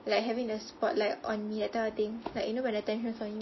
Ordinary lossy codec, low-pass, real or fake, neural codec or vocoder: MP3, 24 kbps; 7.2 kHz; real; none